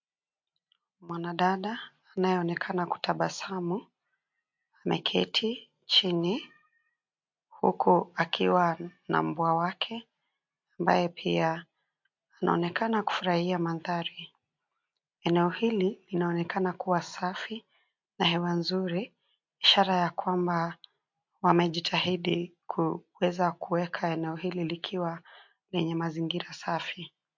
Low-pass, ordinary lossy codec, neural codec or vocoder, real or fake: 7.2 kHz; MP3, 48 kbps; none; real